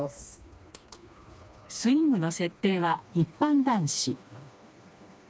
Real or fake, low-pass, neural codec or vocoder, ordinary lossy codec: fake; none; codec, 16 kHz, 2 kbps, FreqCodec, smaller model; none